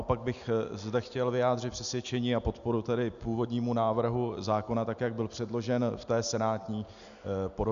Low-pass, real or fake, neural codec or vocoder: 7.2 kHz; real; none